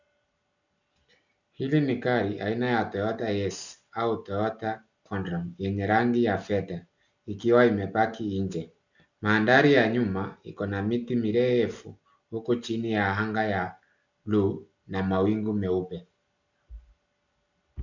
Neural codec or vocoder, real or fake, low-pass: none; real; 7.2 kHz